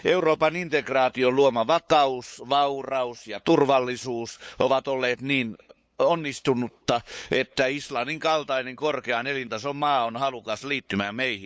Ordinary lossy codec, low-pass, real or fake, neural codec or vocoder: none; none; fake; codec, 16 kHz, 8 kbps, FunCodec, trained on LibriTTS, 25 frames a second